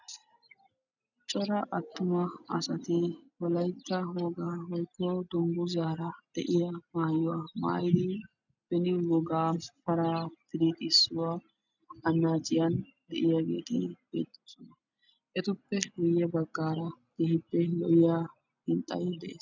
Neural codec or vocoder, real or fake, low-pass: none; real; 7.2 kHz